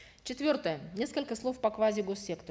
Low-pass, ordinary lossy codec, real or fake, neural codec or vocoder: none; none; real; none